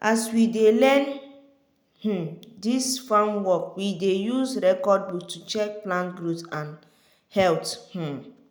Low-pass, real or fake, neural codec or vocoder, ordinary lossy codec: none; real; none; none